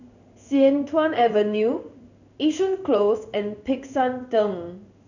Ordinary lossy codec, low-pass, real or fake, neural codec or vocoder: none; 7.2 kHz; fake; codec, 16 kHz in and 24 kHz out, 1 kbps, XY-Tokenizer